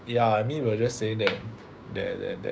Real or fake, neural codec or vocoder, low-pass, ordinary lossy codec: fake; codec, 16 kHz, 6 kbps, DAC; none; none